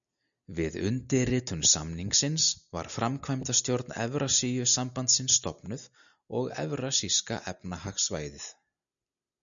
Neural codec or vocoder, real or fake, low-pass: none; real; 7.2 kHz